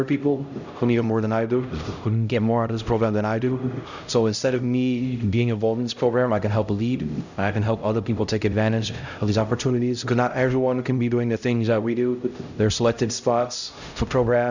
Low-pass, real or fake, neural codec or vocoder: 7.2 kHz; fake; codec, 16 kHz, 0.5 kbps, X-Codec, HuBERT features, trained on LibriSpeech